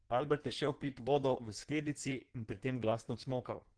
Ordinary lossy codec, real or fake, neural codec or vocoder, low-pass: Opus, 16 kbps; fake; codec, 16 kHz in and 24 kHz out, 1.1 kbps, FireRedTTS-2 codec; 9.9 kHz